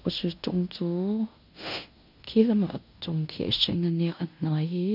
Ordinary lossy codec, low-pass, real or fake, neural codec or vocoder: none; 5.4 kHz; fake; codec, 16 kHz in and 24 kHz out, 0.9 kbps, LongCat-Audio-Codec, fine tuned four codebook decoder